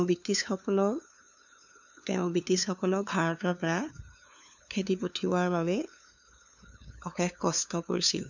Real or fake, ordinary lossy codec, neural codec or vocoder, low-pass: fake; none; codec, 16 kHz, 2 kbps, FunCodec, trained on LibriTTS, 25 frames a second; 7.2 kHz